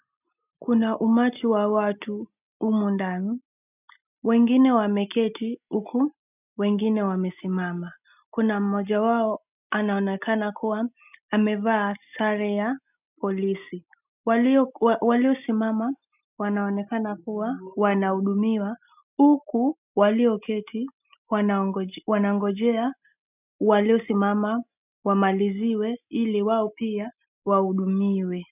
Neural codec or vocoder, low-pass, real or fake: none; 3.6 kHz; real